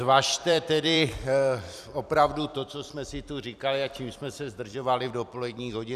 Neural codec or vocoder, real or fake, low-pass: vocoder, 44.1 kHz, 128 mel bands every 256 samples, BigVGAN v2; fake; 14.4 kHz